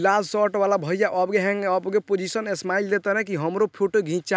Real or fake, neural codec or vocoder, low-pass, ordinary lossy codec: real; none; none; none